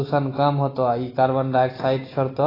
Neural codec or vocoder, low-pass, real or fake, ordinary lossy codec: none; 5.4 kHz; real; AAC, 24 kbps